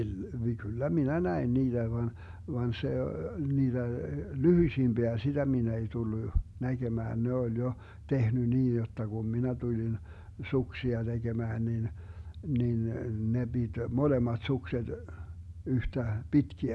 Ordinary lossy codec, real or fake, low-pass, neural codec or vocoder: none; real; none; none